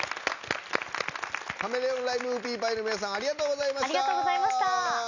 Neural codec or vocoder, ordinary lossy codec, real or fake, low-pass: none; none; real; 7.2 kHz